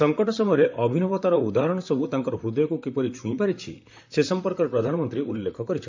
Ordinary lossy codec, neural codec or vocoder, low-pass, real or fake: MP3, 64 kbps; vocoder, 44.1 kHz, 128 mel bands, Pupu-Vocoder; 7.2 kHz; fake